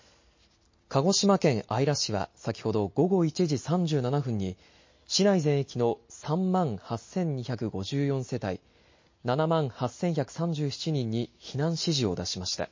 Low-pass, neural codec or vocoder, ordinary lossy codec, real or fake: 7.2 kHz; none; MP3, 32 kbps; real